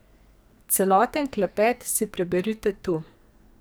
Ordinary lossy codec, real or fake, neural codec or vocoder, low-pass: none; fake; codec, 44.1 kHz, 2.6 kbps, SNAC; none